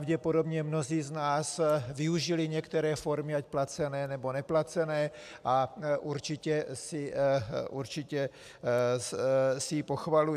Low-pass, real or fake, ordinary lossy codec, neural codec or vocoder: 14.4 kHz; real; AAC, 96 kbps; none